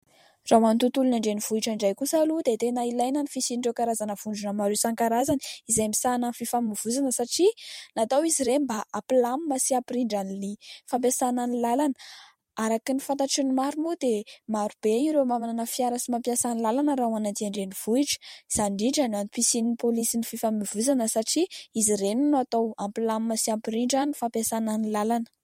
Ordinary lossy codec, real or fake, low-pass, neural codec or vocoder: MP3, 64 kbps; fake; 19.8 kHz; vocoder, 44.1 kHz, 128 mel bands every 512 samples, BigVGAN v2